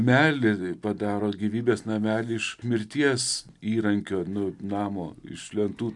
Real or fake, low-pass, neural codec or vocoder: real; 10.8 kHz; none